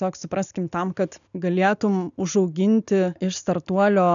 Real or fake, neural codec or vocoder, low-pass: real; none; 7.2 kHz